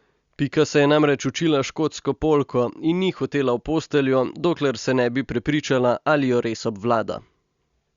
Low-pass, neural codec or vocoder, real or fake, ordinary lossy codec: 7.2 kHz; none; real; Opus, 64 kbps